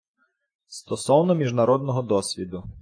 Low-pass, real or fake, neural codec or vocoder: 9.9 kHz; fake; vocoder, 48 kHz, 128 mel bands, Vocos